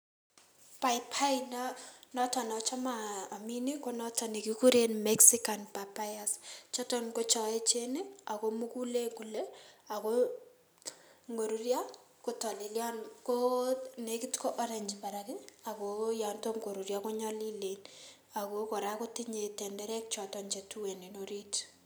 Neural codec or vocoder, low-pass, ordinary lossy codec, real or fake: none; none; none; real